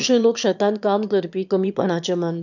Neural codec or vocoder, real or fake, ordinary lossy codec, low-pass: autoencoder, 22.05 kHz, a latent of 192 numbers a frame, VITS, trained on one speaker; fake; none; 7.2 kHz